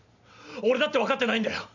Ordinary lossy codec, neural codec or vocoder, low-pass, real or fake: none; none; 7.2 kHz; real